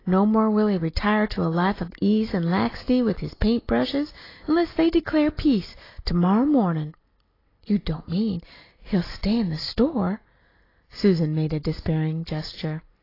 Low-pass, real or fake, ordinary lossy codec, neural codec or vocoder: 5.4 kHz; real; AAC, 24 kbps; none